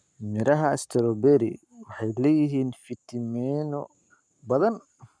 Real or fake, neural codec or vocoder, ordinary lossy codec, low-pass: fake; codec, 44.1 kHz, 7.8 kbps, Pupu-Codec; none; 9.9 kHz